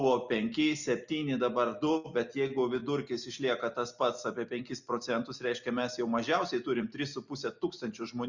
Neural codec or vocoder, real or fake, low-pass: none; real; 7.2 kHz